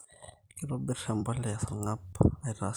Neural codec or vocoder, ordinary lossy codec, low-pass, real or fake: vocoder, 44.1 kHz, 128 mel bands every 256 samples, BigVGAN v2; none; none; fake